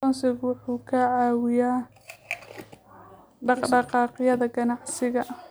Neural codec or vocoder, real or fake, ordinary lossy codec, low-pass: none; real; none; none